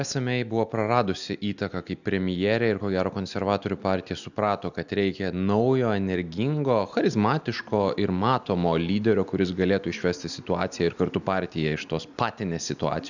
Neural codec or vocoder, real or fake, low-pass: none; real; 7.2 kHz